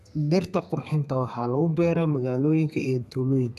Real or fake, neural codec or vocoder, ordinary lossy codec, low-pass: fake; codec, 32 kHz, 1.9 kbps, SNAC; none; 14.4 kHz